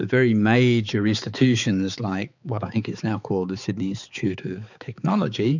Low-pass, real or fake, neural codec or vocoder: 7.2 kHz; fake; codec, 16 kHz, 4 kbps, X-Codec, HuBERT features, trained on balanced general audio